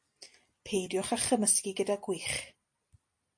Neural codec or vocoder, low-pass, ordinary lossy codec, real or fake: none; 9.9 kHz; MP3, 48 kbps; real